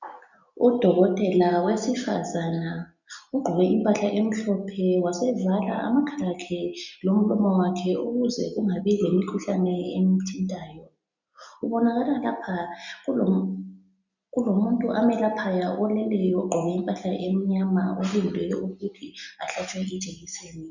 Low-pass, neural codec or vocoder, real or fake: 7.2 kHz; none; real